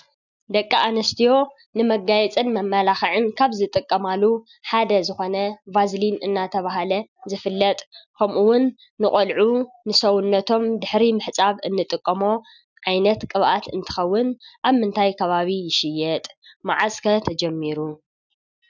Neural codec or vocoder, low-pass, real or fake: none; 7.2 kHz; real